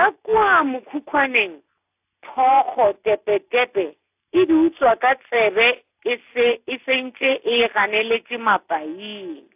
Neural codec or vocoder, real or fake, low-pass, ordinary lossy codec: none; real; 3.6 kHz; AAC, 32 kbps